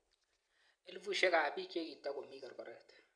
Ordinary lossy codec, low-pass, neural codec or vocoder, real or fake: Opus, 64 kbps; 9.9 kHz; none; real